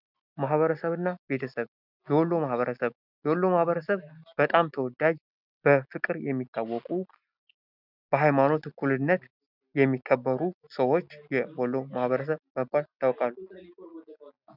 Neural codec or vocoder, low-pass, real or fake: none; 5.4 kHz; real